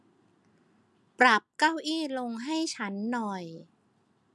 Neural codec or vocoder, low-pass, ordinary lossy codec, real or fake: none; none; none; real